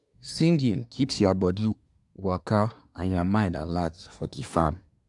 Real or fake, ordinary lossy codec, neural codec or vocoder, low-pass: fake; none; codec, 24 kHz, 1 kbps, SNAC; 10.8 kHz